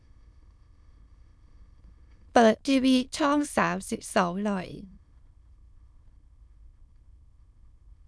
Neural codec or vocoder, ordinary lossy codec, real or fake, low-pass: autoencoder, 22.05 kHz, a latent of 192 numbers a frame, VITS, trained on many speakers; none; fake; none